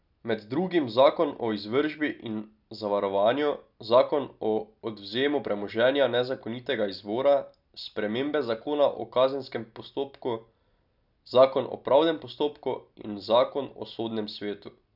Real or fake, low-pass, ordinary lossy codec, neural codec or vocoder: real; 5.4 kHz; none; none